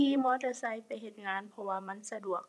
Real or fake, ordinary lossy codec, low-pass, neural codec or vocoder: fake; none; none; vocoder, 24 kHz, 100 mel bands, Vocos